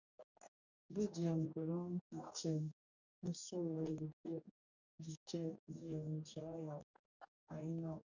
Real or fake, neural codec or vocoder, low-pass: fake; codec, 44.1 kHz, 2.6 kbps, DAC; 7.2 kHz